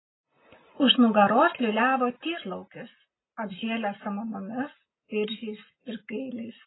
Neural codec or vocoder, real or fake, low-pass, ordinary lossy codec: none; real; 7.2 kHz; AAC, 16 kbps